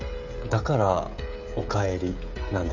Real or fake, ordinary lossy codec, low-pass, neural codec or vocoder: fake; none; 7.2 kHz; codec, 16 kHz, 16 kbps, FreqCodec, smaller model